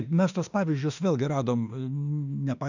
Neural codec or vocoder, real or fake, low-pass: autoencoder, 48 kHz, 32 numbers a frame, DAC-VAE, trained on Japanese speech; fake; 7.2 kHz